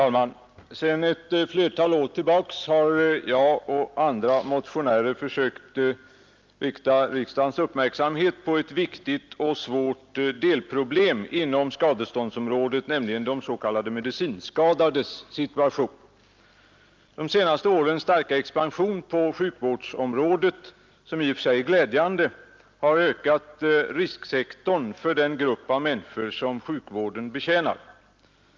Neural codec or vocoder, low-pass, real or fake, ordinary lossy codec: none; 7.2 kHz; real; Opus, 24 kbps